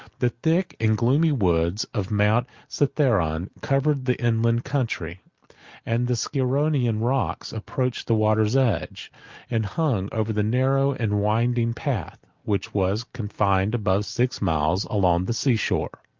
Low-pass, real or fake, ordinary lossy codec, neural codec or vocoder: 7.2 kHz; real; Opus, 32 kbps; none